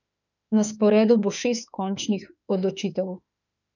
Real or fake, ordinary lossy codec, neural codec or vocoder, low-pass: fake; none; autoencoder, 48 kHz, 32 numbers a frame, DAC-VAE, trained on Japanese speech; 7.2 kHz